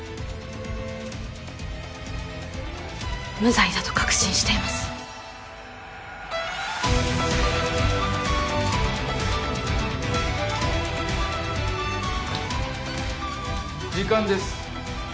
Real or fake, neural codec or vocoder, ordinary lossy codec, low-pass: real; none; none; none